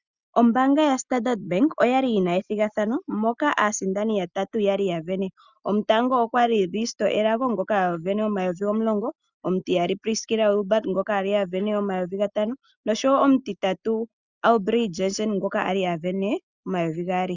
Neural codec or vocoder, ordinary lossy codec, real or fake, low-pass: none; Opus, 64 kbps; real; 7.2 kHz